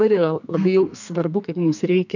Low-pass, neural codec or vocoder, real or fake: 7.2 kHz; codec, 32 kHz, 1.9 kbps, SNAC; fake